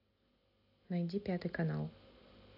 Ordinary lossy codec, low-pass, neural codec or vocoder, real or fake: AAC, 48 kbps; 5.4 kHz; none; real